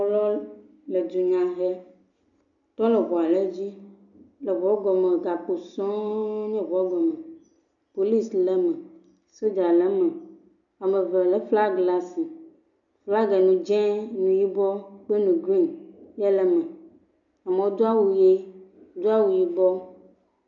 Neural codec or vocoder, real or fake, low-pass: none; real; 7.2 kHz